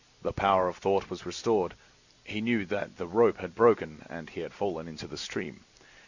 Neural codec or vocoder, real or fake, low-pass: none; real; 7.2 kHz